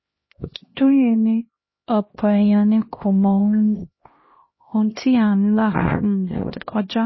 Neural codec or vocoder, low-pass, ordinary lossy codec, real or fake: codec, 16 kHz, 1 kbps, X-Codec, HuBERT features, trained on LibriSpeech; 7.2 kHz; MP3, 24 kbps; fake